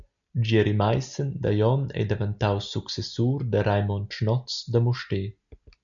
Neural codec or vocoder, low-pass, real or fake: none; 7.2 kHz; real